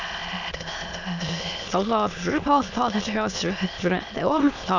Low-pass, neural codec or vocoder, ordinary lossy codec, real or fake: 7.2 kHz; autoencoder, 22.05 kHz, a latent of 192 numbers a frame, VITS, trained on many speakers; none; fake